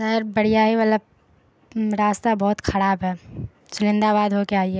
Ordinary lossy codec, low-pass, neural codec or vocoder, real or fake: none; none; none; real